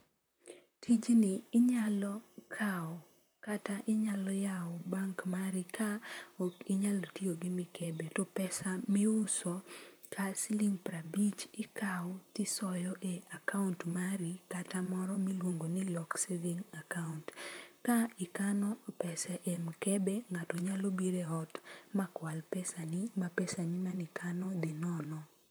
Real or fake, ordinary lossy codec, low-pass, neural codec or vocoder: fake; none; none; vocoder, 44.1 kHz, 128 mel bands, Pupu-Vocoder